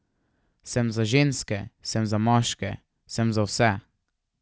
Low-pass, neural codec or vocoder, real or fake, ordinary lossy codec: none; none; real; none